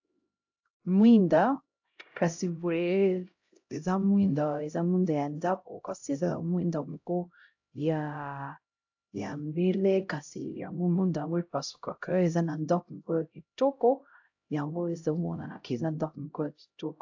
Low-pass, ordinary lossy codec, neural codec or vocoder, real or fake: 7.2 kHz; AAC, 48 kbps; codec, 16 kHz, 0.5 kbps, X-Codec, HuBERT features, trained on LibriSpeech; fake